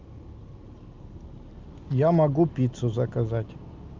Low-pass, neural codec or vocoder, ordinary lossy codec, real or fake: 7.2 kHz; none; Opus, 24 kbps; real